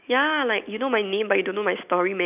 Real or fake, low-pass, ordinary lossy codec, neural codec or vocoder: real; 3.6 kHz; none; none